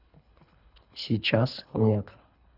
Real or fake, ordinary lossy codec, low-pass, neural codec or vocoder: fake; none; 5.4 kHz; codec, 24 kHz, 3 kbps, HILCodec